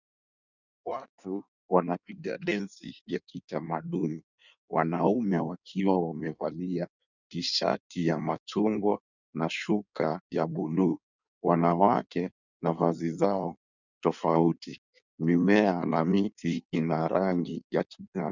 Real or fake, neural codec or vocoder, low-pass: fake; codec, 16 kHz in and 24 kHz out, 1.1 kbps, FireRedTTS-2 codec; 7.2 kHz